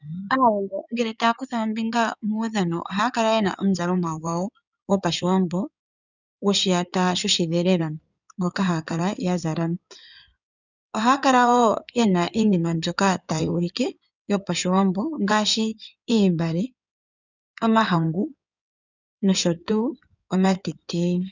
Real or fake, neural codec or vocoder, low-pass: fake; codec, 16 kHz in and 24 kHz out, 2.2 kbps, FireRedTTS-2 codec; 7.2 kHz